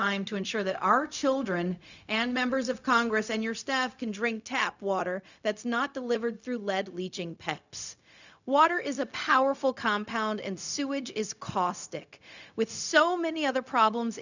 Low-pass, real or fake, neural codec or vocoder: 7.2 kHz; fake; codec, 16 kHz, 0.4 kbps, LongCat-Audio-Codec